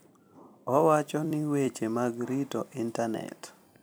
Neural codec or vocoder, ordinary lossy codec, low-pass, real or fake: vocoder, 44.1 kHz, 128 mel bands every 256 samples, BigVGAN v2; none; none; fake